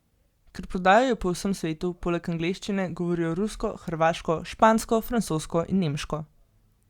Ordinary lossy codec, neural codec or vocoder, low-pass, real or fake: Opus, 64 kbps; none; 19.8 kHz; real